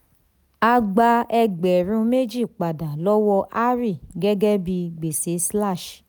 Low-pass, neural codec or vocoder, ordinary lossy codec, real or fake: none; none; none; real